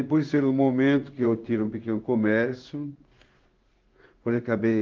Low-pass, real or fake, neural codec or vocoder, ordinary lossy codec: 7.2 kHz; fake; codec, 16 kHz in and 24 kHz out, 1 kbps, XY-Tokenizer; Opus, 24 kbps